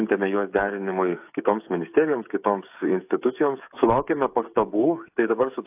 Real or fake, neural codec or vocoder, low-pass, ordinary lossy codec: fake; codec, 16 kHz, 8 kbps, FreqCodec, smaller model; 3.6 kHz; AAC, 32 kbps